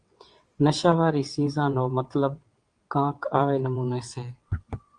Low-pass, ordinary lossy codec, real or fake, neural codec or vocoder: 9.9 kHz; Opus, 32 kbps; fake; vocoder, 22.05 kHz, 80 mel bands, WaveNeXt